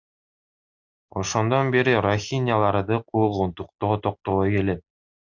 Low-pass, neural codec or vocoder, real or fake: 7.2 kHz; none; real